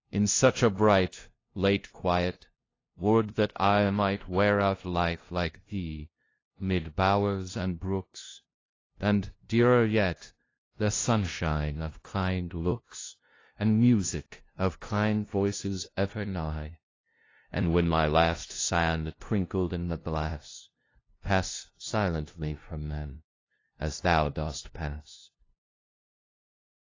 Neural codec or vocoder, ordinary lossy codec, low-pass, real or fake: codec, 16 kHz, 0.5 kbps, FunCodec, trained on LibriTTS, 25 frames a second; AAC, 32 kbps; 7.2 kHz; fake